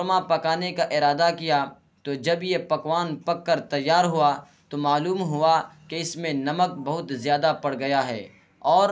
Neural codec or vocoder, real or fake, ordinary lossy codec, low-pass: none; real; none; none